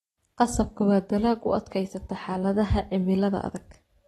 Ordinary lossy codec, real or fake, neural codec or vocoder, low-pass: AAC, 32 kbps; real; none; 19.8 kHz